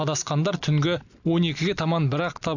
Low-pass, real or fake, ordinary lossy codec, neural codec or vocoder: 7.2 kHz; real; none; none